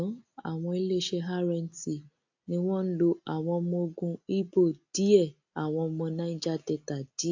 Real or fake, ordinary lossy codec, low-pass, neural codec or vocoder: real; AAC, 48 kbps; 7.2 kHz; none